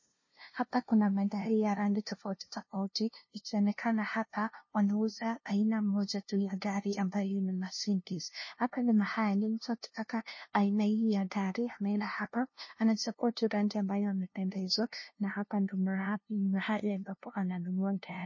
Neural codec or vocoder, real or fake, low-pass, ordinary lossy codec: codec, 16 kHz, 0.5 kbps, FunCodec, trained on LibriTTS, 25 frames a second; fake; 7.2 kHz; MP3, 32 kbps